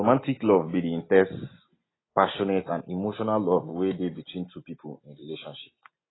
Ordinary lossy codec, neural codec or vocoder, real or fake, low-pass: AAC, 16 kbps; none; real; 7.2 kHz